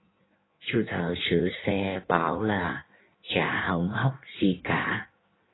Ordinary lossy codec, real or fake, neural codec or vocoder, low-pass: AAC, 16 kbps; fake; codec, 16 kHz in and 24 kHz out, 1.1 kbps, FireRedTTS-2 codec; 7.2 kHz